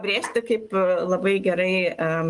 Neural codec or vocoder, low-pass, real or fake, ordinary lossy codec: none; 10.8 kHz; real; Opus, 24 kbps